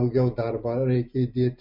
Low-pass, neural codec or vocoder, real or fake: 5.4 kHz; none; real